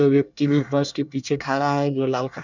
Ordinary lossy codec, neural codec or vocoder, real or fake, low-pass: none; codec, 24 kHz, 1 kbps, SNAC; fake; 7.2 kHz